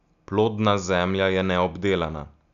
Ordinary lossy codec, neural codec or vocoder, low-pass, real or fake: none; none; 7.2 kHz; real